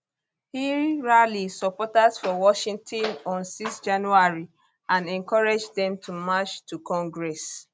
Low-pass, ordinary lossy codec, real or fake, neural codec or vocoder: none; none; real; none